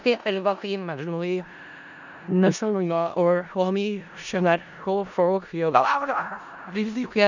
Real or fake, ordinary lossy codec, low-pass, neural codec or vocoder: fake; none; 7.2 kHz; codec, 16 kHz in and 24 kHz out, 0.4 kbps, LongCat-Audio-Codec, four codebook decoder